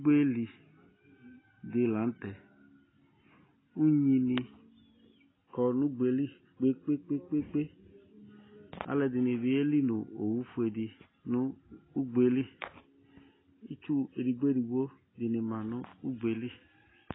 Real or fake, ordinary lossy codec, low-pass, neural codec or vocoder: real; AAC, 16 kbps; 7.2 kHz; none